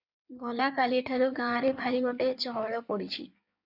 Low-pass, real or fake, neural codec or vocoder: 5.4 kHz; fake; codec, 16 kHz in and 24 kHz out, 1.1 kbps, FireRedTTS-2 codec